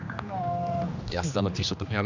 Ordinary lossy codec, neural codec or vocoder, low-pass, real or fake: none; codec, 16 kHz, 2 kbps, X-Codec, HuBERT features, trained on general audio; 7.2 kHz; fake